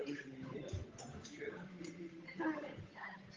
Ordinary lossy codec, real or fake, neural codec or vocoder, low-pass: Opus, 32 kbps; fake; codec, 16 kHz, 8 kbps, FunCodec, trained on Chinese and English, 25 frames a second; 7.2 kHz